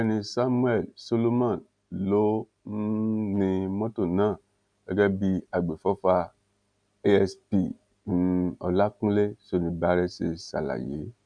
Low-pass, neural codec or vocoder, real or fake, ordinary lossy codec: 9.9 kHz; none; real; none